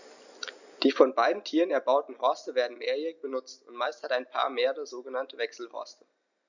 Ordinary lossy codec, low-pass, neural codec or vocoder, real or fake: none; 7.2 kHz; none; real